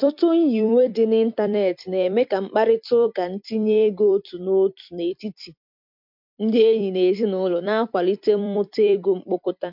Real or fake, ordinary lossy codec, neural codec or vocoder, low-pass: fake; MP3, 48 kbps; vocoder, 44.1 kHz, 128 mel bands every 256 samples, BigVGAN v2; 5.4 kHz